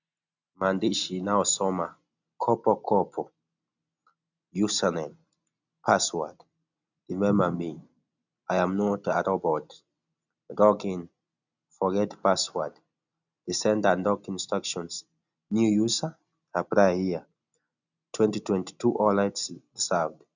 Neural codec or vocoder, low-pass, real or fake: none; 7.2 kHz; real